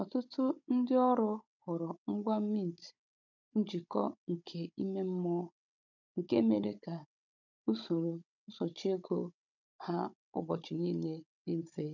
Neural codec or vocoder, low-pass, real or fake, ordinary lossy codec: codec, 16 kHz, 16 kbps, FunCodec, trained on Chinese and English, 50 frames a second; 7.2 kHz; fake; none